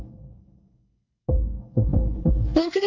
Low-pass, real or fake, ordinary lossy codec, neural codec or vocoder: 7.2 kHz; fake; Opus, 64 kbps; codec, 24 kHz, 1 kbps, SNAC